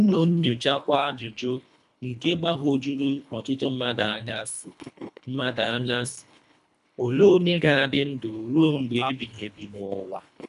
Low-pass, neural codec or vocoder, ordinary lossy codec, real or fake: 10.8 kHz; codec, 24 kHz, 1.5 kbps, HILCodec; AAC, 96 kbps; fake